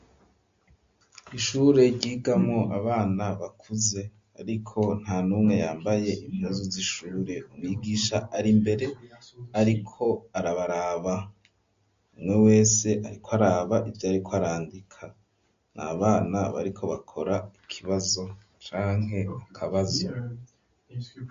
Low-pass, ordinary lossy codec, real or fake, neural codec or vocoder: 7.2 kHz; MP3, 48 kbps; real; none